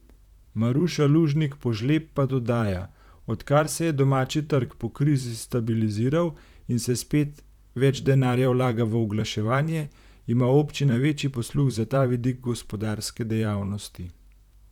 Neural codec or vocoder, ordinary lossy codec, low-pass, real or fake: vocoder, 44.1 kHz, 128 mel bands, Pupu-Vocoder; none; 19.8 kHz; fake